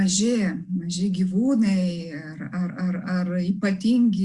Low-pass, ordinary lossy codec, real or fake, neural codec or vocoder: 10.8 kHz; Opus, 64 kbps; real; none